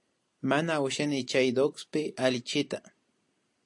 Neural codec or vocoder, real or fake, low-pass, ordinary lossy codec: none; real; 10.8 kHz; AAC, 64 kbps